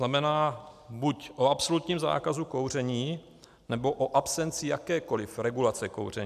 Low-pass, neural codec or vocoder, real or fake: 14.4 kHz; none; real